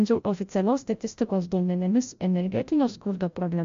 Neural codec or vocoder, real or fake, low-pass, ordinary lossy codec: codec, 16 kHz, 0.5 kbps, FreqCodec, larger model; fake; 7.2 kHz; MP3, 48 kbps